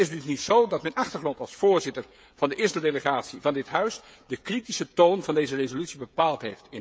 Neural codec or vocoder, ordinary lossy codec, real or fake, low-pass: codec, 16 kHz, 16 kbps, FreqCodec, smaller model; none; fake; none